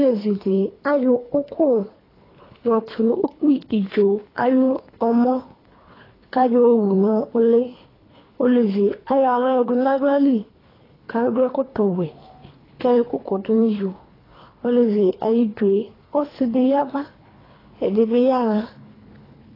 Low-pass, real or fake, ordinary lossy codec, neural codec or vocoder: 5.4 kHz; fake; AAC, 24 kbps; codec, 24 kHz, 3 kbps, HILCodec